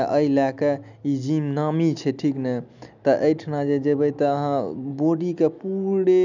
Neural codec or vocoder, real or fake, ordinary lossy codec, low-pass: none; real; none; 7.2 kHz